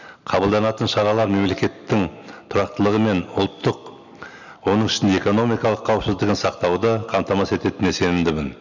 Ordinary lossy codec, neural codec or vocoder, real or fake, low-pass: none; none; real; 7.2 kHz